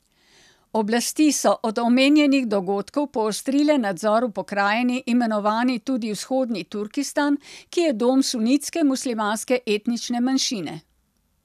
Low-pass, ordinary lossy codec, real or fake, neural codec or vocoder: 14.4 kHz; none; real; none